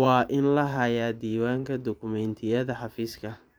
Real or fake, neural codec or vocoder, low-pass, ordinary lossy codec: fake; vocoder, 44.1 kHz, 128 mel bands every 512 samples, BigVGAN v2; none; none